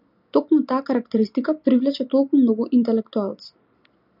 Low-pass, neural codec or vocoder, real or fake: 5.4 kHz; none; real